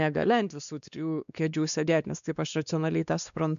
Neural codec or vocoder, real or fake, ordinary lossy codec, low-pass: codec, 16 kHz, 2 kbps, X-Codec, WavLM features, trained on Multilingual LibriSpeech; fake; MP3, 96 kbps; 7.2 kHz